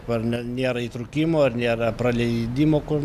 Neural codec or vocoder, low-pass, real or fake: none; 14.4 kHz; real